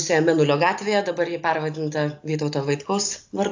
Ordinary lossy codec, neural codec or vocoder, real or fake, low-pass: AAC, 48 kbps; none; real; 7.2 kHz